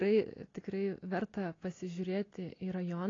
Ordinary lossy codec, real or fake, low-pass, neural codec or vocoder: AAC, 32 kbps; real; 7.2 kHz; none